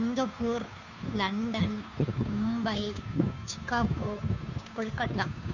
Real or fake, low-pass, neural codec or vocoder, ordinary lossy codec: fake; 7.2 kHz; codec, 16 kHz, 2 kbps, FunCodec, trained on Chinese and English, 25 frames a second; none